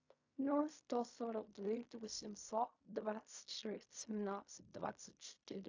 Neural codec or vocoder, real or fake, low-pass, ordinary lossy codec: codec, 16 kHz in and 24 kHz out, 0.4 kbps, LongCat-Audio-Codec, fine tuned four codebook decoder; fake; 7.2 kHz; AAC, 48 kbps